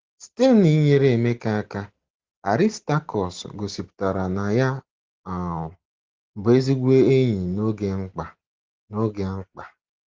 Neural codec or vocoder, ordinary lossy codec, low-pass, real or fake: none; Opus, 16 kbps; 7.2 kHz; real